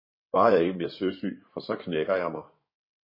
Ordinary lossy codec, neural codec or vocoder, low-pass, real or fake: MP3, 24 kbps; codec, 16 kHz in and 24 kHz out, 2.2 kbps, FireRedTTS-2 codec; 5.4 kHz; fake